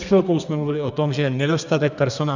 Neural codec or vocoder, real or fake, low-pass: codec, 32 kHz, 1.9 kbps, SNAC; fake; 7.2 kHz